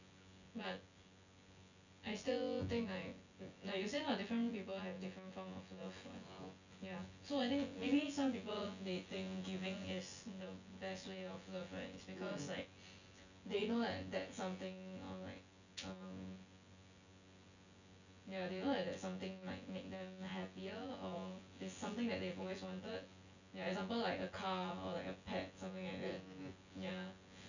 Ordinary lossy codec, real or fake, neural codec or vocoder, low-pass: none; fake; vocoder, 24 kHz, 100 mel bands, Vocos; 7.2 kHz